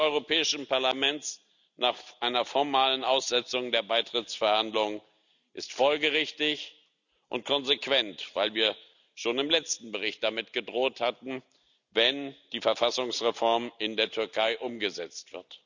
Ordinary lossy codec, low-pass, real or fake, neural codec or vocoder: none; 7.2 kHz; real; none